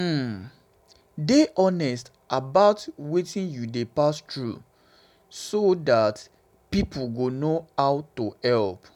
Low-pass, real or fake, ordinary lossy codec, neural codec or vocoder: 19.8 kHz; real; none; none